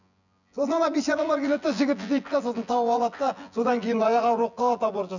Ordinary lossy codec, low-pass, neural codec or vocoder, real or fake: none; 7.2 kHz; vocoder, 24 kHz, 100 mel bands, Vocos; fake